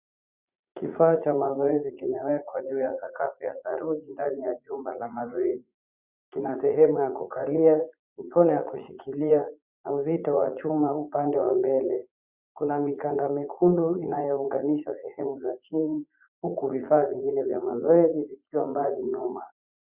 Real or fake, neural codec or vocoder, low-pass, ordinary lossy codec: fake; vocoder, 44.1 kHz, 80 mel bands, Vocos; 3.6 kHz; Opus, 64 kbps